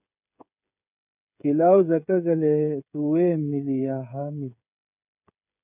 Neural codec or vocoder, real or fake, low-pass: codec, 16 kHz, 8 kbps, FreqCodec, smaller model; fake; 3.6 kHz